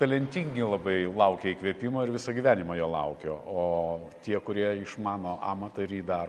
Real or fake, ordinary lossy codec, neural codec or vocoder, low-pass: real; Opus, 32 kbps; none; 14.4 kHz